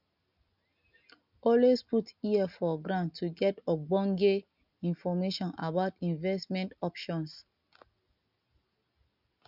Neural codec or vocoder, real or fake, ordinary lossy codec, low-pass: none; real; none; 5.4 kHz